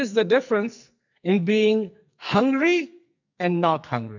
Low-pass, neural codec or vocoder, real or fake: 7.2 kHz; codec, 44.1 kHz, 2.6 kbps, SNAC; fake